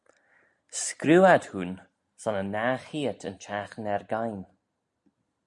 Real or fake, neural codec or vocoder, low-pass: real; none; 10.8 kHz